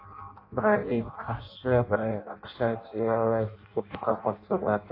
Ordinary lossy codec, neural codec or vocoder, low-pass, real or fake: AAC, 32 kbps; codec, 16 kHz in and 24 kHz out, 0.6 kbps, FireRedTTS-2 codec; 5.4 kHz; fake